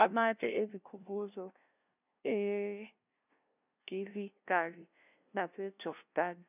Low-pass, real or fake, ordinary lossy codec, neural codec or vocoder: 3.6 kHz; fake; none; codec, 16 kHz, 0.5 kbps, FunCodec, trained on LibriTTS, 25 frames a second